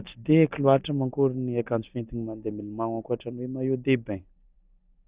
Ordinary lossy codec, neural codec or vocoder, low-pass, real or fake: Opus, 64 kbps; none; 3.6 kHz; real